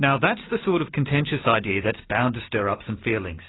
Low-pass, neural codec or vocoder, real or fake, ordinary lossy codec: 7.2 kHz; none; real; AAC, 16 kbps